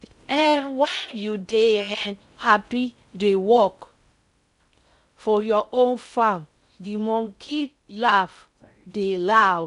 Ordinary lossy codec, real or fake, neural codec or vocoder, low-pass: AAC, 96 kbps; fake; codec, 16 kHz in and 24 kHz out, 0.6 kbps, FocalCodec, streaming, 4096 codes; 10.8 kHz